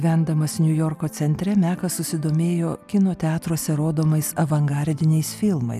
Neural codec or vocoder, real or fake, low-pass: none; real; 14.4 kHz